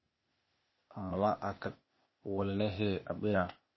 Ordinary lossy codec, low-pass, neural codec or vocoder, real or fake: MP3, 24 kbps; 7.2 kHz; codec, 16 kHz, 0.8 kbps, ZipCodec; fake